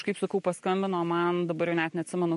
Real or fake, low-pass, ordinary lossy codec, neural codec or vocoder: real; 14.4 kHz; MP3, 48 kbps; none